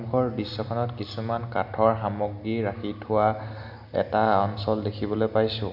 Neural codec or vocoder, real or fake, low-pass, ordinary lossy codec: none; real; 5.4 kHz; none